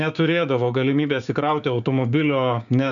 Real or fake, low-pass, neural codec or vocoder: fake; 7.2 kHz; codec, 16 kHz, 6 kbps, DAC